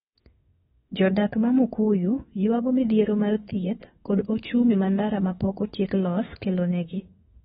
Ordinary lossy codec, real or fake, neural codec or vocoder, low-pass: AAC, 16 kbps; fake; autoencoder, 48 kHz, 32 numbers a frame, DAC-VAE, trained on Japanese speech; 19.8 kHz